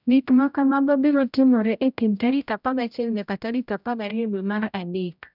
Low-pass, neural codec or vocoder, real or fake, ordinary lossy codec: 5.4 kHz; codec, 16 kHz, 0.5 kbps, X-Codec, HuBERT features, trained on general audio; fake; none